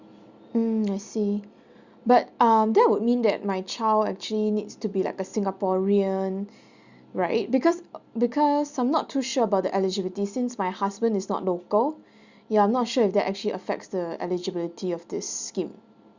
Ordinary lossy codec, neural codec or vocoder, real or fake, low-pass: Opus, 64 kbps; none; real; 7.2 kHz